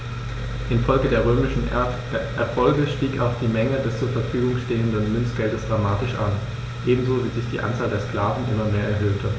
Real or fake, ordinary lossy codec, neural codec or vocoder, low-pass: real; none; none; none